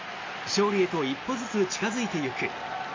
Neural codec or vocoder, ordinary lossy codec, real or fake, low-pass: none; MP3, 32 kbps; real; 7.2 kHz